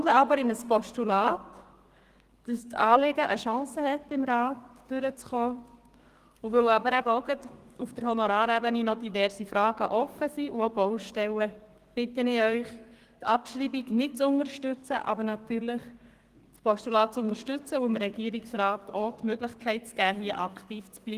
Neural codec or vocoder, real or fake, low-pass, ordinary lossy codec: codec, 32 kHz, 1.9 kbps, SNAC; fake; 14.4 kHz; Opus, 24 kbps